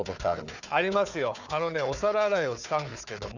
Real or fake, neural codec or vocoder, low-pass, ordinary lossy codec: fake; codec, 16 kHz, 4 kbps, FunCodec, trained on Chinese and English, 50 frames a second; 7.2 kHz; none